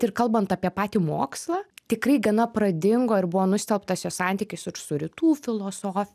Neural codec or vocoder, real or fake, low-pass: none; real; 14.4 kHz